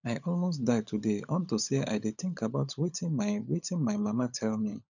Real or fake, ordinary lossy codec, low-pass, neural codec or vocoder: fake; none; 7.2 kHz; codec, 16 kHz, 16 kbps, FunCodec, trained on LibriTTS, 50 frames a second